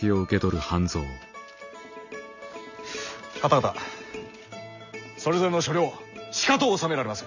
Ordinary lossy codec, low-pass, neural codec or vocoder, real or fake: none; 7.2 kHz; none; real